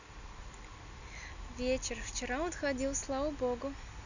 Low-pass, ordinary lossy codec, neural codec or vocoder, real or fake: 7.2 kHz; none; none; real